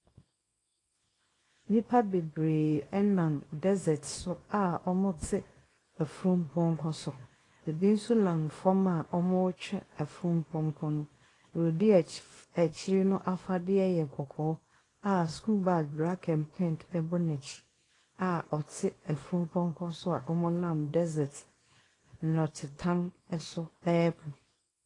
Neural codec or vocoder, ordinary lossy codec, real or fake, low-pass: codec, 24 kHz, 0.9 kbps, WavTokenizer, small release; AAC, 32 kbps; fake; 10.8 kHz